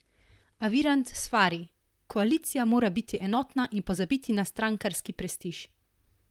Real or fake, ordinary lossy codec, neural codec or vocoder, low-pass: fake; Opus, 32 kbps; vocoder, 44.1 kHz, 128 mel bands, Pupu-Vocoder; 19.8 kHz